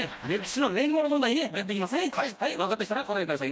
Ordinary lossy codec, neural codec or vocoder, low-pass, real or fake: none; codec, 16 kHz, 1 kbps, FreqCodec, smaller model; none; fake